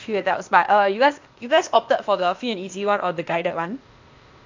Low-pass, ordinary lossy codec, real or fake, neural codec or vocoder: 7.2 kHz; MP3, 48 kbps; fake; codec, 16 kHz, 0.8 kbps, ZipCodec